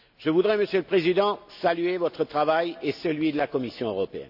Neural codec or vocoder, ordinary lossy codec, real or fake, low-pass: none; none; real; 5.4 kHz